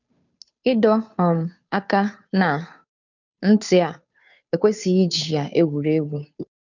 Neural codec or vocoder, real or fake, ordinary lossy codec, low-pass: codec, 16 kHz, 2 kbps, FunCodec, trained on Chinese and English, 25 frames a second; fake; none; 7.2 kHz